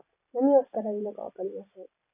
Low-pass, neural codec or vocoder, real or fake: 3.6 kHz; none; real